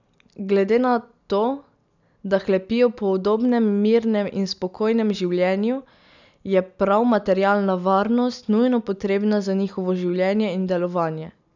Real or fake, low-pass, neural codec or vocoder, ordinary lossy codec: real; 7.2 kHz; none; none